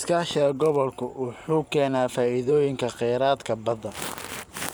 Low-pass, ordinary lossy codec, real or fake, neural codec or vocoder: none; none; fake; vocoder, 44.1 kHz, 128 mel bands every 512 samples, BigVGAN v2